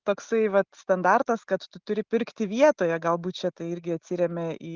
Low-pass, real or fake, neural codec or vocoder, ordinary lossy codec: 7.2 kHz; real; none; Opus, 16 kbps